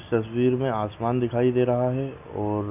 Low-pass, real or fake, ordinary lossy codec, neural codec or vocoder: 3.6 kHz; real; none; none